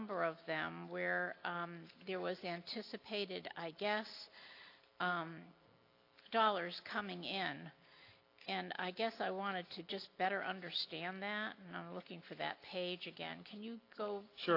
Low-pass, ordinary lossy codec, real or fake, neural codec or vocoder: 5.4 kHz; AAC, 32 kbps; real; none